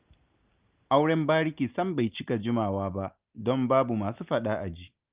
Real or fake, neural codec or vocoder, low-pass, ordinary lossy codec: real; none; 3.6 kHz; Opus, 32 kbps